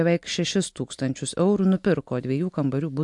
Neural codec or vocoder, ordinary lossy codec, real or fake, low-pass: none; MP3, 64 kbps; real; 10.8 kHz